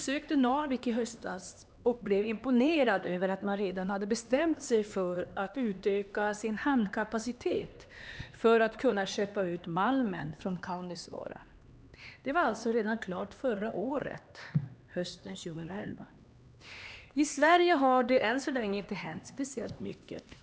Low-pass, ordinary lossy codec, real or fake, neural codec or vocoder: none; none; fake; codec, 16 kHz, 2 kbps, X-Codec, HuBERT features, trained on LibriSpeech